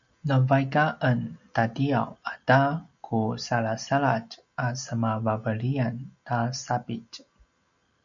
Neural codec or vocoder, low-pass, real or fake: none; 7.2 kHz; real